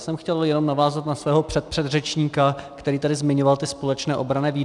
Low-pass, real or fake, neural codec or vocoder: 10.8 kHz; real; none